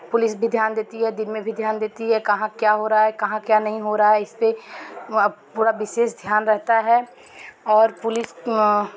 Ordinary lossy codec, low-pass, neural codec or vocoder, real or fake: none; none; none; real